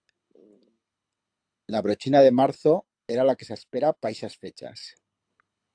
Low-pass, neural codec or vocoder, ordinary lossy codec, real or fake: 9.9 kHz; codec, 24 kHz, 6 kbps, HILCodec; AAC, 64 kbps; fake